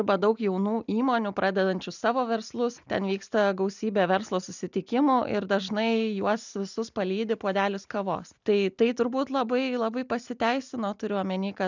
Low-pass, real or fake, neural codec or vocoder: 7.2 kHz; real; none